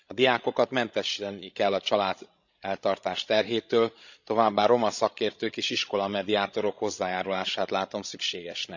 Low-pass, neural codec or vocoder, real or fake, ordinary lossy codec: 7.2 kHz; codec, 16 kHz, 16 kbps, FreqCodec, larger model; fake; none